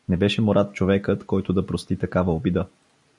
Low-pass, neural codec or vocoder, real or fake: 10.8 kHz; none; real